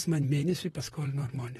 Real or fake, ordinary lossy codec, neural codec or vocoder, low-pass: fake; AAC, 32 kbps; vocoder, 44.1 kHz, 128 mel bands, Pupu-Vocoder; 19.8 kHz